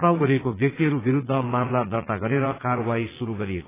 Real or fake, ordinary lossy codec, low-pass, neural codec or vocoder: fake; AAC, 16 kbps; 3.6 kHz; vocoder, 22.05 kHz, 80 mel bands, Vocos